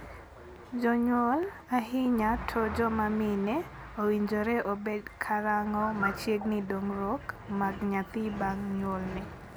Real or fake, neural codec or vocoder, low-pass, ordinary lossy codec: real; none; none; none